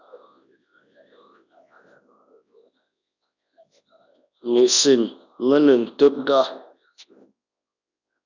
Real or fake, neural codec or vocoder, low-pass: fake; codec, 24 kHz, 0.9 kbps, WavTokenizer, large speech release; 7.2 kHz